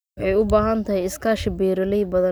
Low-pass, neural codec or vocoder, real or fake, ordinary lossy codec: none; none; real; none